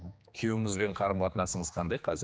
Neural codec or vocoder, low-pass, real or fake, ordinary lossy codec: codec, 16 kHz, 2 kbps, X-Codec, HuBERT features, trained on general audio; none; fake; none